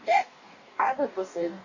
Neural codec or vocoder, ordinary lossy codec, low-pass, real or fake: codec, 44.1 kHz, 2.6 kbps, DAC; none; 7.2 kHz; fake